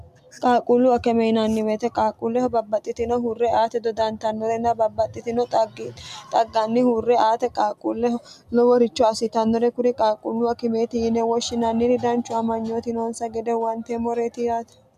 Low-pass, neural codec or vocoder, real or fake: 14.4 kHz; none; real